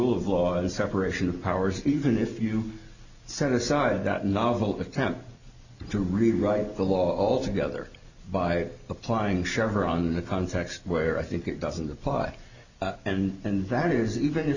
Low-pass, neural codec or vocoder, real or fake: 7.2 kHz; none; real